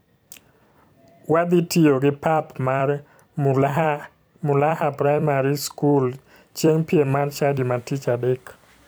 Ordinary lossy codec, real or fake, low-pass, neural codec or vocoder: none; fake; none; vocoder, 44.1 kHz, 128 mel bands every 256 samples, BigVGAN v2